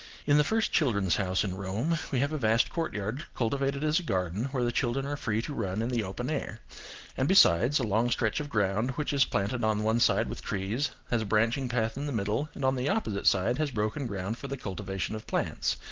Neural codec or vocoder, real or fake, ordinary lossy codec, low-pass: none; real; Opus, 16 kbps; 7.2 kHz